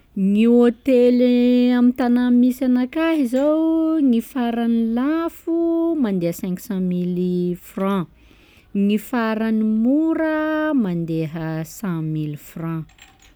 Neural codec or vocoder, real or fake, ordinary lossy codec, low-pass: none; real; none; none